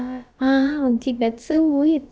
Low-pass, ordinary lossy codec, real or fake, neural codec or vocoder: none; none; fake; codec, 16 kHz, about 1 kbps, DyCAST, with the encoder's durations